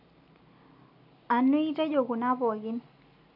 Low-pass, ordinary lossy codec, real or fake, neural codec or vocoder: 5.4 kHz; none; real; none